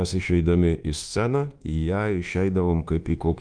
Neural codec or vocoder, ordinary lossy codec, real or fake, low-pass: codec, 24 kHz, 1.2 kbps, DualCodec; Opus, 24 kbps; fake; 9.9 kHz